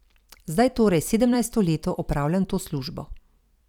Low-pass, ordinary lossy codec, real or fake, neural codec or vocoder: 19.8 kHz; none; fake; vocoder, 44.1 kHz, 128 mel bands every 256 samples, BigVGAN v2